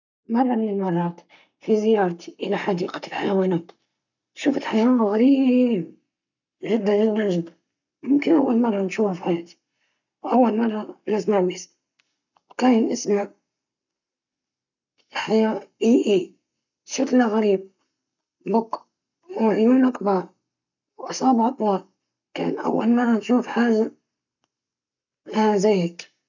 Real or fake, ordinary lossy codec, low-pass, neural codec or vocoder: fake; none; 7.2 kHz; codec, 44.1 kHz, 7.8 kbps, Pupu-Codec